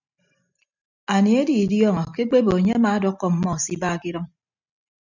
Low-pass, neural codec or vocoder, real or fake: 7.2 kHz; none; real